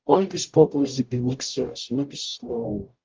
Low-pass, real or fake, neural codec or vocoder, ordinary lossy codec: 7.2 kHz; fake; codec, 44.1 kHz, 0.9 kbps, DAC; Opus, 32 kbps